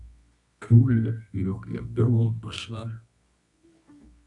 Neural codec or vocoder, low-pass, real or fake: codec, 24 kHz, 0.9 kbps, WavTokenizer, medium music audio release; 10.8 kHz; fake